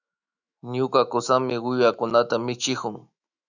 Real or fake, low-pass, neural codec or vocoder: fake; 7.2 kHz; autoencoder, 48 kHz, 128 numbers a frame, DAC-VAE, trained on Japanese speech